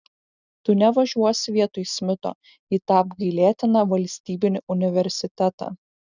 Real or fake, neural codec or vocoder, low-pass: real; none; 7.2 kHz